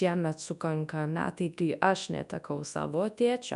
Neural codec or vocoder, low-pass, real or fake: codec, 24 kHz, 0.9 kbps, WavTokenizer, large speech release; 10.8 kHz; fake